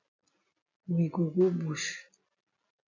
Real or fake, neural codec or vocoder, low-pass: real; none; 7.2 kHz